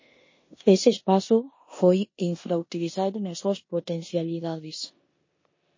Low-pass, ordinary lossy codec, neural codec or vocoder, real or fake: 7.2 kHz; MP3, 32 kbps; codec, 16 kHz in and 24 kHz out, 0.9 kbps, LongCat-Audio-Codec, four codebook decoder; fake